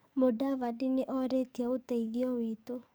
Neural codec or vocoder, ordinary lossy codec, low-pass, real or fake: codec, 44.1 kHz, 7.8 kbps, DAC; none; none; fake